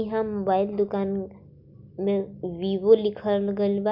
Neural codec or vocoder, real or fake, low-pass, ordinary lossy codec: none; real; 5.4 kHz; Opus, 64 kbps